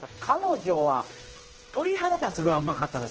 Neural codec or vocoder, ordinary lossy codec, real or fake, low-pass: codec, 16 kHz, 1 kbps, X-Codec, HuBERT features, trained on general audio; Opus, 16 kbps; fake; 7.2 kHz